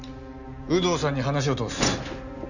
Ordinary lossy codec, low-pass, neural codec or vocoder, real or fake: none; 7.2 kHz; none; real